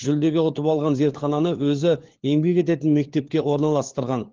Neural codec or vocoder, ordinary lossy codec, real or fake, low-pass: codec, 16 kHz, 4 kbps, FunCodec, trained on LibriTTS, 50 frames a second; Opus, 16 kbps; fake; 7.2 kHz